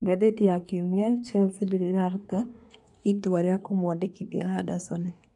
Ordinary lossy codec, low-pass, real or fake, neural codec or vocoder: none; 10.8 kHz; fake; codec, 24 kHz, 1 kbps, SNAC